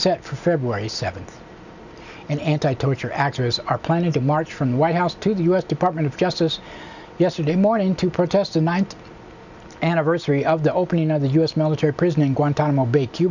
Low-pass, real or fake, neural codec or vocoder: 7.2 kHz; real; none